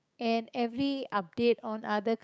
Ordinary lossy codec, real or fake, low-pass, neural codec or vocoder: none; fake; none; codec, 16 kHz, 6 kbps, DAC